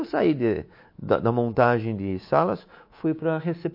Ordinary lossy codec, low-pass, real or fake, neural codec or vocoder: MP3, 32 kbps; 5.4 kHz; fake; codec, 24 kHz, 3.1 kbps, DualCodec